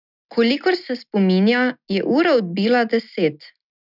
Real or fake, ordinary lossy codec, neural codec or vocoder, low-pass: real; none; none; 5.4 kHz